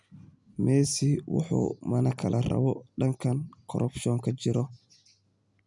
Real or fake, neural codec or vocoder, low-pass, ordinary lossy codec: real; none; 10.8 kHz; none